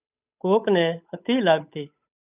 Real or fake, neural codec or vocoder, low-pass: fake; codec, 16 kHz, 8 kbps, FunCodec, trained on Chinese and English, 25 frames a second; 3.6 kHz